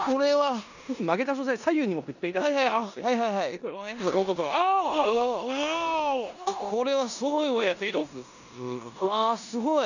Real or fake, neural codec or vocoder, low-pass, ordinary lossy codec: fake; codec, 16 kHz in and 24 kHz out, 0.9 kbps, LongCat-Audio-Codec, four codebook decoder; 7.2 kHz; none